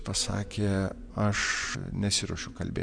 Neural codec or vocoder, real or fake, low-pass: vocoder, 24 kHz, 100 mel bands, Vocos; fake; 9.9 kHz